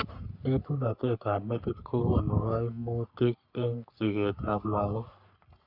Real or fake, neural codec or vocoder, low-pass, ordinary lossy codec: fake; codec, 44.1 kHz, 3.4 kbps, Pupu-Codec; 5.4 kHz; none